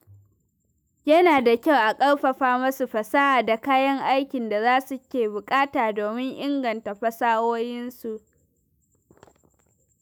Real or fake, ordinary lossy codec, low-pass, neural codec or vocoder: fake; none; none; autoencoder, 48 kHz, 128 numbers a frame, DAC-VAE, trained on Japanese speech